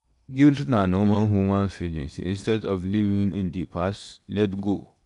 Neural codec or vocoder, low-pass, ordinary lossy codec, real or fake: codec, 16 kHz in and 24 kHz out, 0.8 kbps, FocalCodec, streaming, 65536 codes; 10.8 kHz; none; fake